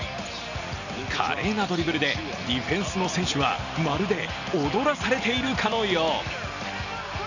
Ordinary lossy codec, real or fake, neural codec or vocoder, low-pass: none; real; none; 7.2 kHz